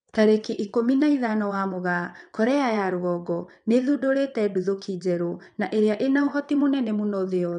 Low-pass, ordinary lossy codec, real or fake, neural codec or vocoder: 9.9 kHz; none; fake; vocoder, 22.05 kHz, 80 mel bands, WaveNeXt